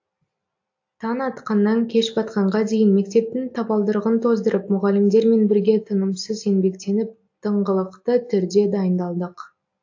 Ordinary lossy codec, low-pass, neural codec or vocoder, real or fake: AAC, 48 kbps; 7.2 kHz; none; real